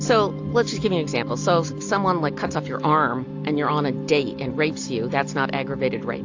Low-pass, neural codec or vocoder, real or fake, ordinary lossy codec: 7.2 kHz; none; real; AAC, 48 kbps